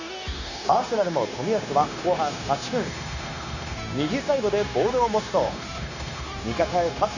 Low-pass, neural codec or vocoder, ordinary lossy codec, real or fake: 7.2 kHz; codec, 16 kHz, 0.9 kbps, LongCat-Audio-Codec; none; fake